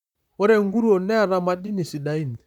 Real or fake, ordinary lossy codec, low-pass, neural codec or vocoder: fake; none; 19.8 kHz; vocoder, 44.1 kHz, 128 mel bands, Pupu-Vocoder